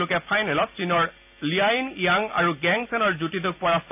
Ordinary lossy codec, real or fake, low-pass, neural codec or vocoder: none; real; 3.6 kHz; none